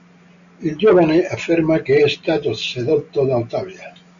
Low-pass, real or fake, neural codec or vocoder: 7.2 kHz; real; none